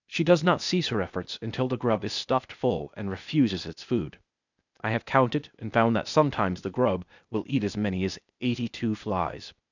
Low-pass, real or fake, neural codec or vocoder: 7.2 kHz; fake; codec, 16 kHz, 0.8 kbps, ZipCodec